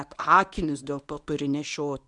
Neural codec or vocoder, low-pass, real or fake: codec, 24 kHz, 0.9 kbps, WavTokenizer, medium speech release version 1; 10.8 kHz; fake